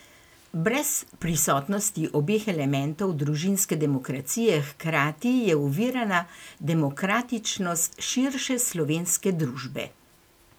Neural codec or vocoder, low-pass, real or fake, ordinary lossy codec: none; none; real; none